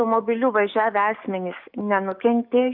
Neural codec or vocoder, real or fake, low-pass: codec, 44.1 kHz, 7.8 kbps, DAC; fake; 5.4 kHz